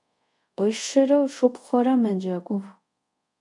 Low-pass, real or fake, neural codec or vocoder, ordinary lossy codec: 10.8 kHz; fake; codec, 24 kHz, 0.5 kbps, DualCodec; AAC, 64 kbps